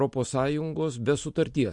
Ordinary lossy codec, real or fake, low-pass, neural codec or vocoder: MP3, 48 kbps; real; 10.8 kHz; none